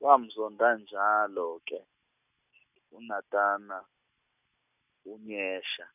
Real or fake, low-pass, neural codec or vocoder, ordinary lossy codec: real; 3.6 kHz; none; none